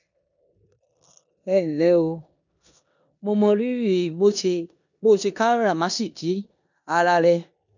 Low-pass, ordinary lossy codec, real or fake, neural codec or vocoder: 7.2 kHz; none; fake; codec, 16 kHz in and 24 kHz out, 0.9 kbps, LongCat-Audio-Codec, four codebook decoder